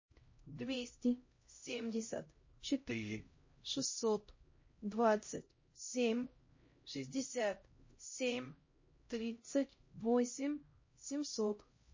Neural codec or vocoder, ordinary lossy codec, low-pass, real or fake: codec, 16 kHz, 0.5 kbps, X-Codec, HuBERT features, trained on LibriSpeech; MP3, 32 kbps; 7.2 kHz; fake